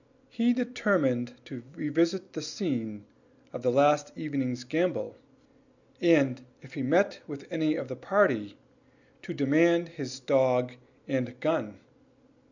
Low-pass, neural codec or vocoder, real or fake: 7.2 kHz; none; real